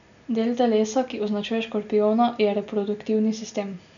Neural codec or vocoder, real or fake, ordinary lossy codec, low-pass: none; real; none; 7.2 kHz